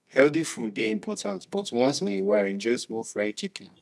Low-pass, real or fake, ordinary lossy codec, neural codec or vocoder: none; fake; none; codec, 24 kHz, 0.9 kbps, WavTokenizer, medium music audio release